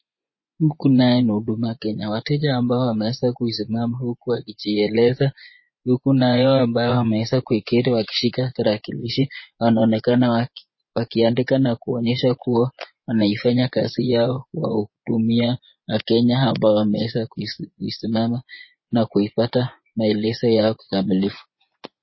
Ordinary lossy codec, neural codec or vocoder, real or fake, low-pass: MP3, 24 kbps; vocoder, 24 kHz, 100 mel bands, Vocos; fake; 7.2 kHz